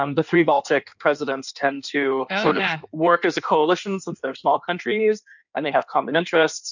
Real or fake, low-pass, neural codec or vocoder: fake; 7.2 kHz; codec, 16 kHz in and 24 kHz out, 1.1 kbps, FireRedTTS-2 codec